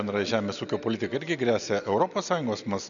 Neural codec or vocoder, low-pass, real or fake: none; 7.2 kHz; real